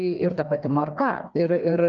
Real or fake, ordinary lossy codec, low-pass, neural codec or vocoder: fake; Opus, 32 kbps; 7.2 kHz; codec, 16 kHz, 2 kbps, FreqCodec, larger model